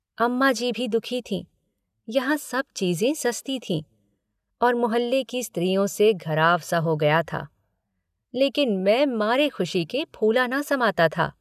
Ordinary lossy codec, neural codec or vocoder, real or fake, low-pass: none; none; real; 14.4 kHz